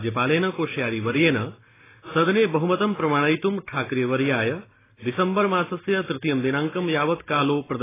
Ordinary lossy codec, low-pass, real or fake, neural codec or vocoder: AAC, 16 kbps; 3.6 kHz; real; none